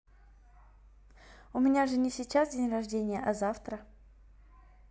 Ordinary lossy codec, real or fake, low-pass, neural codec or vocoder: none; real; none; none